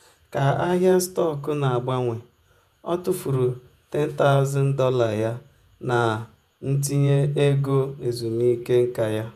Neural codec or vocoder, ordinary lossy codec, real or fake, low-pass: vocoder, 48 kHz, 128 mel bands, Vocos; none; fake; 14.4 kHz